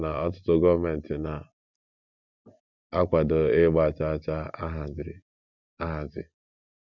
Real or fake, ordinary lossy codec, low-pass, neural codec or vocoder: real; none; 7.2 kHz; none